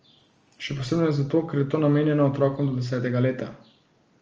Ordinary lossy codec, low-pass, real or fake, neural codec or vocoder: Opus, 24 kbps; 7.2 kHz; real; none